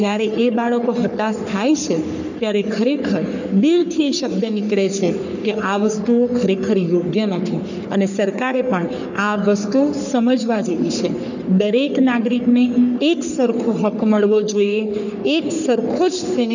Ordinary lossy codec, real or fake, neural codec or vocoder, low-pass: none; fake; codec, 44.1 kHz, 3.4 kbps, Pupu-Codec; 7.2 kHz